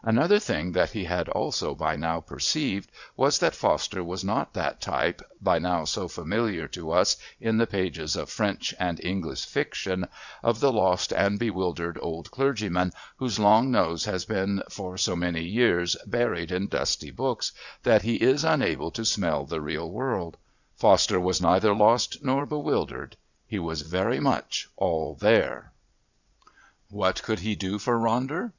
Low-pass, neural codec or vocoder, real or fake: 7.2 kHz; vocoder, 22.05 kHz, 80 mel bands, Vocos; fake